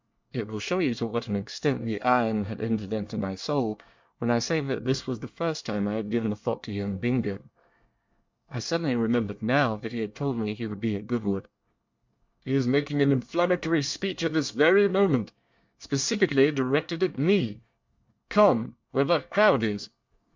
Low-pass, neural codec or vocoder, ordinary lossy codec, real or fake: 7.2 kHz; codec, 24 kHz, 1 kbps, SNAC; MP3, 64 kbps; fake